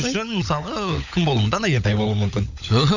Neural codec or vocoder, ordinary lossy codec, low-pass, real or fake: codec, 16 kHz, 16 kbps, FunCodec, trained on LibriTTS, 50 frames a second; none; 7.2 kHz; fake